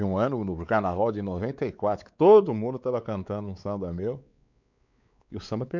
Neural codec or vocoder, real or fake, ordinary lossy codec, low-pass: codec, 16 kHz, 4 kbps, X-Codec, WavLM features, trained on Multilingual LibriSpeech; fake; none; 7.2 kHz